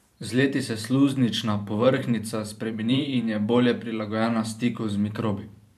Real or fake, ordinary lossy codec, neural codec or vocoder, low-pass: fake; none; vocoder, 44.1 kHz, 128 mel bands every 512 samples, BigVGAN v2; 14.4 kHz